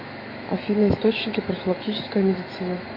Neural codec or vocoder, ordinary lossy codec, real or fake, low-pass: autoencoder, 48 kHz, 128 numbers a frame, DAC-VAE, trained on Japanese speech; MP3, 32 kbps; fake; 5.4 kHz